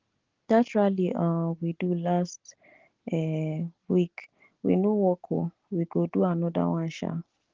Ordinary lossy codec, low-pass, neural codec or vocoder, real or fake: Opus, 16 kbps; 7.2 kHz; none; real